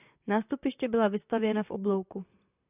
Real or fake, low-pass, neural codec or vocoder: fake; 3.6 kHz; vocoder, 44.1 kHz, 128 mel bands, Pupu-Vocoder